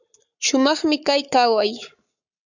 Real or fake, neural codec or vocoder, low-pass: fake; codec, 44.1 kHz, 7.8 kbps, Pupu-Codec; 7.2 kHz